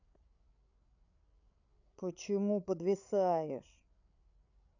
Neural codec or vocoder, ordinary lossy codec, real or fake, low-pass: codec, 16 kHz, 8 kbps, FreqCodec, larger model; none; fake; 7.2 kHz